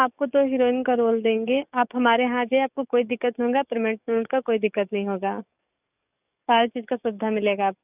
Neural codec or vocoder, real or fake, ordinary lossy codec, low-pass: codec, 44.1 kHz, 7.8 kbps, DAC; fake; none; 3.6 kHz